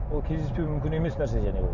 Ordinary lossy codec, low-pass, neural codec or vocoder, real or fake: none; 7.2 kHz; none; real